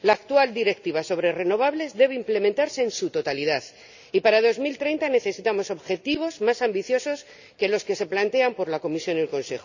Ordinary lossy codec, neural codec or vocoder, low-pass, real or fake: none; none; 7.2 kHz; real